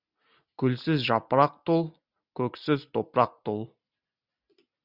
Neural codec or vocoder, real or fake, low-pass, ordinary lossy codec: none; real; 5.4 kHz; Opus, 64 kbps